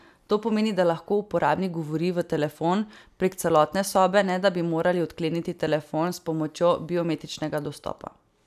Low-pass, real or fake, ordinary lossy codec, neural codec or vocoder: 14.4 kHz; real; none; none